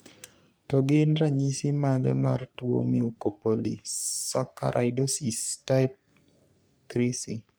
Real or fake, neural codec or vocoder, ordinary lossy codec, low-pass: fake; codec, 44.1 kHz, 3.4 kbps, Pupu-Codec; none; none